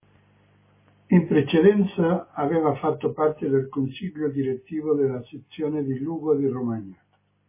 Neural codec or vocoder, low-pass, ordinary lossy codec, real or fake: none; 3.6 kHz; MP3, 16 kbps; real